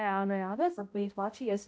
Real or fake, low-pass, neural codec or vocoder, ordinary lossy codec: fake; none; codec, 16 kHz, 0.5 kbps, X-Codec, HuBERT features, trained on balanced general audio; none